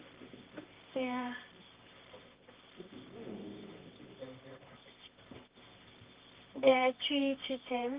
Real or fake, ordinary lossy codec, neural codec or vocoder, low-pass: fake; Opus, 24 kbps; codec, 24 kHz, 0.9 kbps, WavTokenizer, medium music audio release; 3.6 kHz